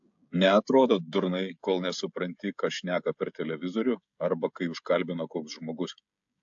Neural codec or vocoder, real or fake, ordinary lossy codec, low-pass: codec, 16 kHz, 16 kbps, FreqCodec, smaller model; fake; AAC, 64 kbps; 7.2 kHz